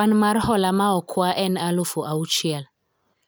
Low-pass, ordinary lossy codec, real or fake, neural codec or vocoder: none; none; real; none